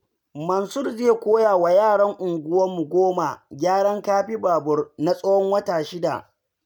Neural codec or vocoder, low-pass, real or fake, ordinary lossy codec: none; none; real; none